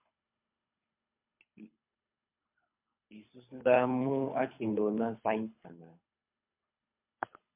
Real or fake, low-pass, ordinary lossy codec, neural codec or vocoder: fake; 3.6 kHz; MP3, 32 kbps; codec, 24 kHz, 3 kbps, HILCodec